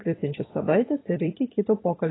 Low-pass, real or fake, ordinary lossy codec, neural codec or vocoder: 7.2 kHz; fake; AAC, 16 kbps; vocoder, 44.1 kHz, 128 mel bands every 256 samples, BigVGAN v2